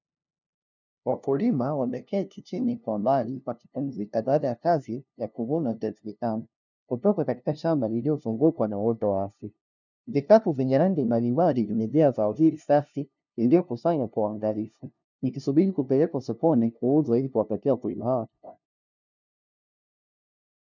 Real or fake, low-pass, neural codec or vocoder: fake; 7.2 kHz; codec, 16 kHz, 0.5 kbps, FunCodec, trained on LibriTTS, 25 frames a second